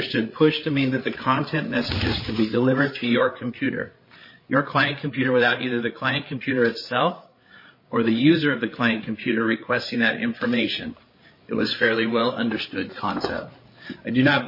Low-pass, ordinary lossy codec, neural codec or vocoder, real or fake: 5.4 kHz; MP3, 24 kbps; codec, 16 kHz, 8 kbps, FreqCodec, larger model; fake